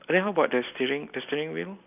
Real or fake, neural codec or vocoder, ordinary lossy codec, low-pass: real; none; none; 3.6 kHz